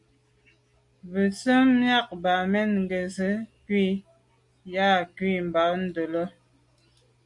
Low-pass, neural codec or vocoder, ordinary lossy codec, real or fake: 10.8 kHz; none; Opus, 64 kbps; real